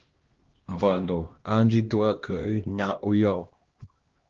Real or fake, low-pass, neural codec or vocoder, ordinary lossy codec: fake; 7.2 kHz; codec, 16 kHz, 1 kbps, X-Codec, HuBERT features, trained on LibriSpeech; Opus, 16 kbps